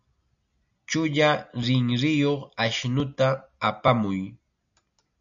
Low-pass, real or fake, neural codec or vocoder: 7.2 kHz; real; none